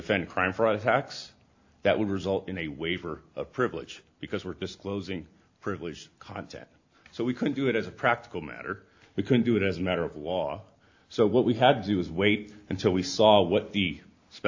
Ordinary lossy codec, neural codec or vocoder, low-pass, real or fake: AAC, 48 kbps; none; 7.2 kHz; real